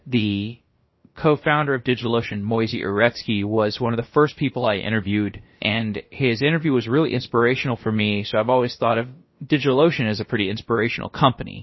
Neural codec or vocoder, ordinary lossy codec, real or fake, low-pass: codec, 16 kHz, about 1 kbps, DyCAST, with the encoder's durations; MP3, 24 kbps; fake; 7.2 kHz